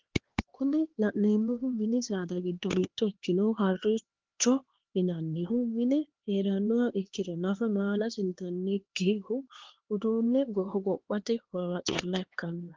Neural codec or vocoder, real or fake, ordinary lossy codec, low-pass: codec, 16 kHz, 2 kbps, X-Codec, HuBERT features, trained on LibriSpeech; fake; Opus, 16 kbps; 7.2 kHz